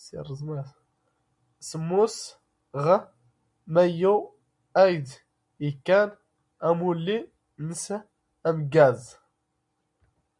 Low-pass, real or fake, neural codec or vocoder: 10.8 kHz; real; none